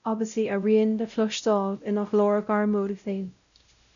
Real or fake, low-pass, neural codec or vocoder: fake; 7.2 kHz; codec, 16 kHz, 0.5 kbps, X-Codec, WavLM features, trained on Multilingual LibriSpeech